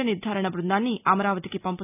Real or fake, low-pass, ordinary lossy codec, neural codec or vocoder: real; 3.6 kHz; none; none